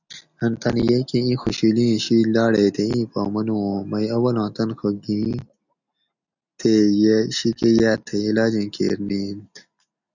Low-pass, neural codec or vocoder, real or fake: 7.2 kHz; none; real